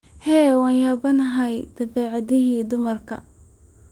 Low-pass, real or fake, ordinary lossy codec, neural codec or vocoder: 19.8 kHz; fake; Opus, 24 kbps; autoencoder, 48 kHz, 32 numbers a frame, DAC-VAE, trained on Japanese speech